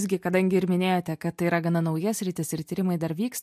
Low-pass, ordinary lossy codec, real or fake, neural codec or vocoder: 14.4 kHz; MP3, 64 kbps; real; none